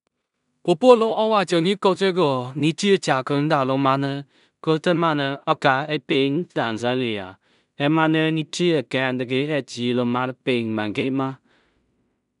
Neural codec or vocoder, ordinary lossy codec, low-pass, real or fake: codec, 16 kHz in and 24 kHz out, 0.4 kbps, LongCat-Audio-Codec, two codebook decoder; none; 10.8 kHz; fake